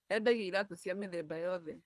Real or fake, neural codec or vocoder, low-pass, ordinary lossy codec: fake; codec, 24 kHz, 3 kbps, HILCodec; none; none